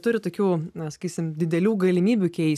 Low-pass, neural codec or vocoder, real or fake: 14.4 kHz; none; real